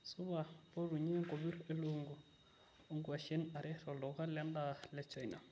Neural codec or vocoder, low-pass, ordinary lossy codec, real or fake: none; none; none; real